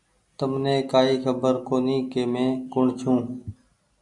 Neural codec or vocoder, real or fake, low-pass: none; real; 10.8 kHz